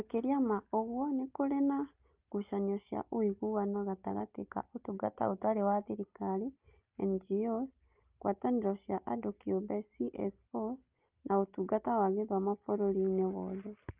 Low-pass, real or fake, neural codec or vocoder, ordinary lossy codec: 3.6 kHz; real; none; Opus, 24 kbps